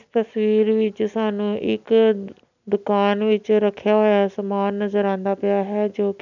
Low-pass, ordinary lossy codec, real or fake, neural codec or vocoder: 7.2 kHz; none; real; none